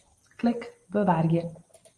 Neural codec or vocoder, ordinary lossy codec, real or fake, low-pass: none; Opus, 24 kbps; real; 10.8 kHz